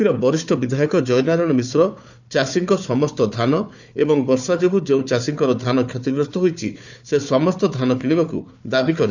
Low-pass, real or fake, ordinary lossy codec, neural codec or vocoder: 7.2 kHz; fake; none; codec, 16 kHz, 4 kbps, FunCodec, trained on Chinese and English, 50 frames a second